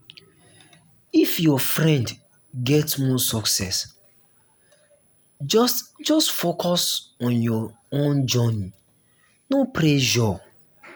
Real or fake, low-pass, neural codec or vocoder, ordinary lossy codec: real; none; none; none